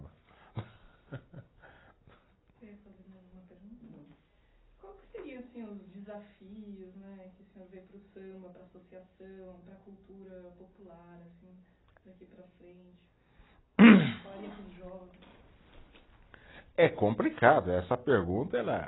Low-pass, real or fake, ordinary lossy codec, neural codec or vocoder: 7.2 kHz; real; AAC, 16 kbps; none